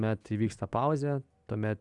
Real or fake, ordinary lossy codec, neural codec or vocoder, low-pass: real; MP3, 96 kbps; none; 10.8 kHz